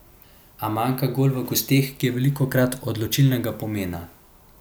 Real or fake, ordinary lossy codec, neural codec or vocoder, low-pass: real; none; none; none